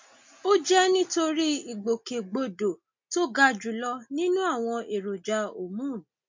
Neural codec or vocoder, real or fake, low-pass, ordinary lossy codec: none; real; 7.2 kHz; MP3, 48 kbps